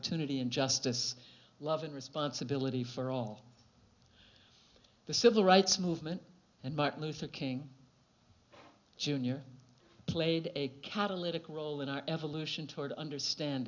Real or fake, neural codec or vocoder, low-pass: real; none; 7.2 kHz